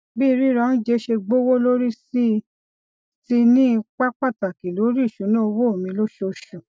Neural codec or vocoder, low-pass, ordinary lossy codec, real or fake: none; none; none; real